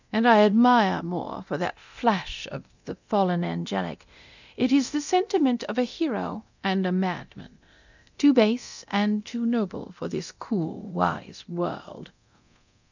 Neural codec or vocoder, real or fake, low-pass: codec, 24 kHz, 0.9 kbps, DualCodec; fake; 7.2 kHz